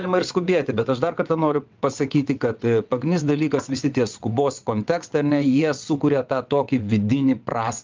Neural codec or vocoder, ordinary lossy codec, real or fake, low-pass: vocoder, 22.05 kHz, 80 mel bands, WaveNeXt; Opus, 24 kbps; fake; 7.2 kHz